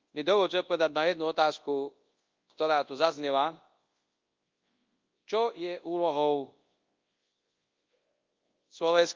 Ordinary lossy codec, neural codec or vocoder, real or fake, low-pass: Opus, 32 kbps; codec, 24 kHz, 0.9 kbps, WavTokenizer, large speech release; fake; 7.2 kHz